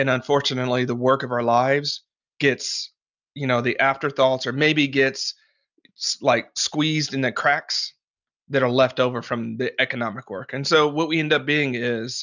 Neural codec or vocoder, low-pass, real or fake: none; 7.2 kHz; real